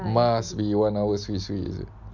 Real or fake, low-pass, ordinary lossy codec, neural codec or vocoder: real; 7.2 kHz; none; none